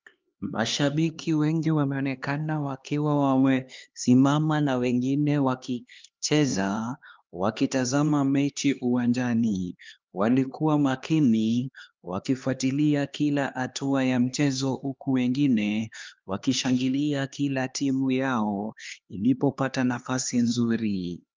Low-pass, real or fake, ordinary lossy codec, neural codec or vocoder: 7.2 kHz; fake; Opus, 24 kbps; codec, 16 kHz, 2 kbps, X-Codec, HuBERT features, trained on LibriSpeech